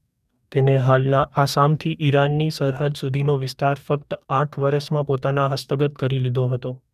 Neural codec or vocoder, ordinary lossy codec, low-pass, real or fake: codec, 44.1 kHz, 2.6 kbps, DAC; none; 14.4 kHz; fake